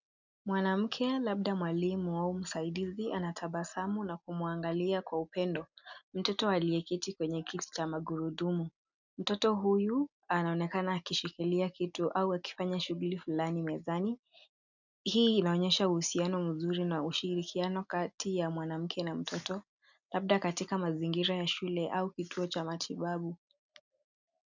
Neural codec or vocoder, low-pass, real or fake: none; 7.2 kHz; real